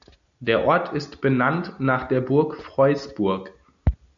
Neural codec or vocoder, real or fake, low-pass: none; real; 7.2 kHz